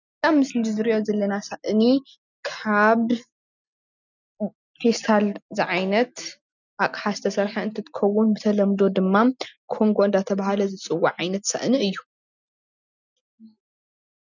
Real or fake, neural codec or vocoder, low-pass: real; none; 7.2 kHz